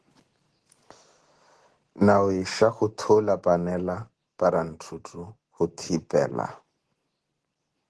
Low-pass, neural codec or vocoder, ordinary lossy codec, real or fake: 10.8 kHz; none; Opus, 16 kbps; real